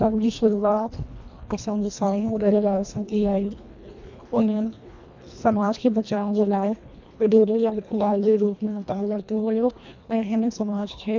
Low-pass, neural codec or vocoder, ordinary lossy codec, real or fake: 7.2 kHz; codec, 24 kHz, 1.5 kbps, HILCodec; MP3, 64 kbps; fake